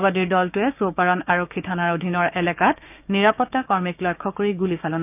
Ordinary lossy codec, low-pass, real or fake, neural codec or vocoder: none; 3.6 kHz; fake; codec, 16 kHz, 6 kbps, DAC